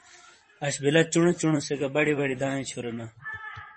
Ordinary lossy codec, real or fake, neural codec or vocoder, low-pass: MP3, 32 kbps; fake; vocoder, 44.1 kHz, 128 mel bands, Pupu-Vocoder; 10.8 kHz